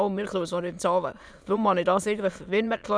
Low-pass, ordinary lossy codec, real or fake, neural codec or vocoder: none; none; fake; autoencoder, 22.05 kHz, a latent of 192 numbers a frame, VITS, trained on many speakers